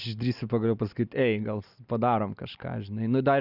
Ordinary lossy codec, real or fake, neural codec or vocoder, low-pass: Opus, 64 kbps; real; none; 5.4 kHz